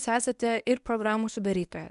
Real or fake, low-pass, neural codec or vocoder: fake; 10.8 kHz; codec, 24 kHz, 0.9 kbps, WavTokenizer, medium speech release version 1